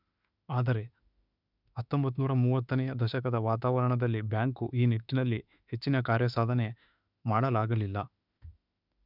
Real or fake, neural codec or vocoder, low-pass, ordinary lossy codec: fake; autoencoder, 48 kHz, 32 numbers a frame, DAC-VAE, trained on Japanese speech; 5.4 kHz; none